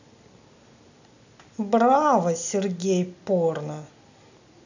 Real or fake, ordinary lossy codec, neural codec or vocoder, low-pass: real; none; none; 7.2 kHz